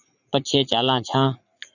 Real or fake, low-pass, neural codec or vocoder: fake; 7.2 kHz; vocoder, 44.1 kHz, 80 mel bands, Vocos